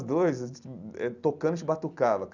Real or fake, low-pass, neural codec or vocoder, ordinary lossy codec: real; 7.2 kHz; none; none